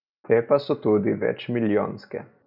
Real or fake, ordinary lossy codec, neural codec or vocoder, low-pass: real; none; none; 5.4 kHz